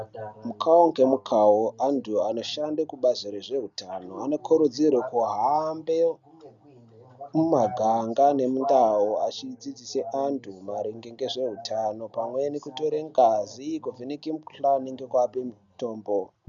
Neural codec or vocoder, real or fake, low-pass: none; real; 7.2 kHz